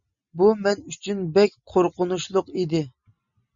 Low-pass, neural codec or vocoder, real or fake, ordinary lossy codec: 7.2 kHz; none; real; Opus, 64 kbps